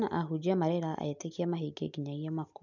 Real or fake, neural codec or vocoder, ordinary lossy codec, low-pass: real; none; none; 7.2 kHz